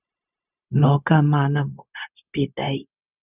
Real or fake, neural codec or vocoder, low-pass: fake; codec, 16 kHz, 0.4 kbps, LongCat-Audio-Codec; 3.6 kHz